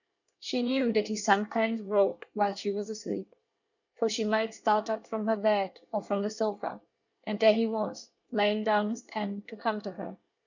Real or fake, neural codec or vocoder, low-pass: fake; codec, 24 kHz, 1 kbps, SNAC; 7.2 kHz